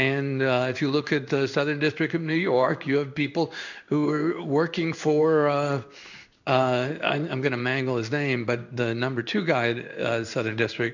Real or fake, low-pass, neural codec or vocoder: fake; 7.2 kHz; codec, 16 kHz in and 24 kHz out, 1 kbps, XY-Tokenizer